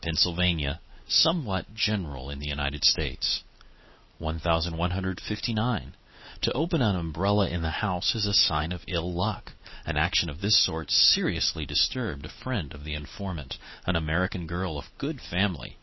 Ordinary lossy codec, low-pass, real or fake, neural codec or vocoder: MP3, 24 kbps; 7.2 kHz; real; none